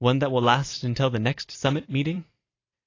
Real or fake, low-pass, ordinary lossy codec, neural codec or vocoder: fake; 7.2 kHz; AAC, 32 kbps; vocoder, 44.1 kHz, 128 mel bands every 256 samples, BigVGAN v2